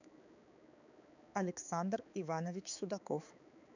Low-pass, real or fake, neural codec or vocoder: 7.2 kHz; fake; codec, 16 kHz, 4 kbps, X-Codec, HuBERT features, trained on balanced general audio